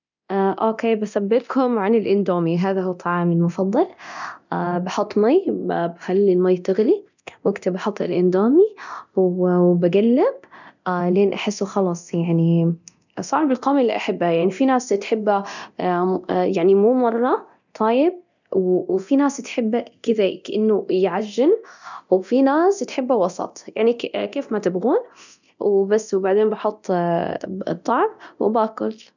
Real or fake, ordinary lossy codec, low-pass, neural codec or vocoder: fake; none; 7.2 kHz; codec, 24 kHz, 0.9 kbps, DualCodec